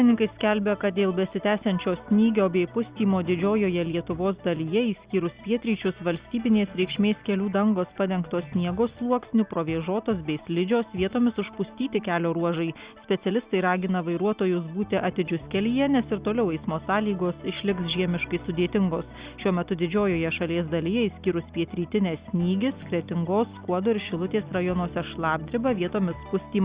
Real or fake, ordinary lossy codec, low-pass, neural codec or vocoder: real; Opus, 64 kbps; 3.6 kHz; none